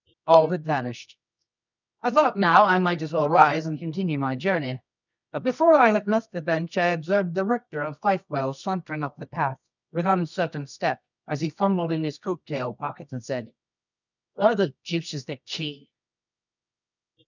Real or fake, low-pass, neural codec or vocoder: fake; 7.2 kHz; codec, 24 kHz, 0.9 kbps, WavTokenizer, medium music audio release